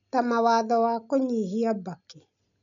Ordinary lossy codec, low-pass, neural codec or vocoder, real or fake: none; 7.2 kHz; none; real